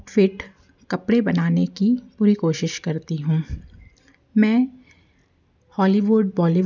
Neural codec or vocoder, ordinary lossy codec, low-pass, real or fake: none; none; 7.2 kHz; real